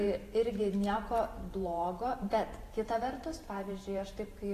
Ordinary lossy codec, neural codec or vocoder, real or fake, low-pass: AAC, 48 kbps; vocoder, 44.1 kHz, 128 mel bands every 512 samples, BigVGAN v2; fake; 14.4 kHz